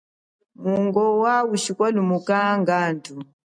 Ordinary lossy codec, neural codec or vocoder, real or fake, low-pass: MP3, 96 kbps; none; real; 9.9 kHz